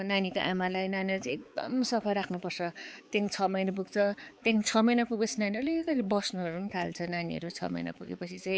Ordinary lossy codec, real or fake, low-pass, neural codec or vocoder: none; fake; none; codec, 16 kHz, 4 kbps, X-Codec, HuBERT features, trained on balanced general audio